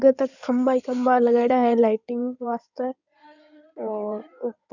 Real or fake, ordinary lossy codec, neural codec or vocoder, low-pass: fake; none; codec, 16 kHz in and 24 kHz out, 2.2 kbps, FireRedTTS-2 codec; 7.2 kHz